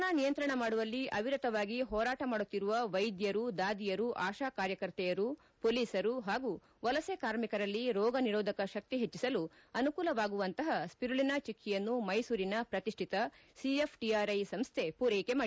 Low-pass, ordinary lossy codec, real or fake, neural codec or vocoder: none; none; real; none